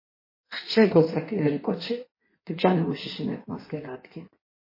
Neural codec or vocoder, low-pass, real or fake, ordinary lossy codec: codec, 16 kHz in and 24 kHz out, 1.1 kbps, FireRedTTS-2 codec; 5.4 kHz; fake; MP3, 24 kbps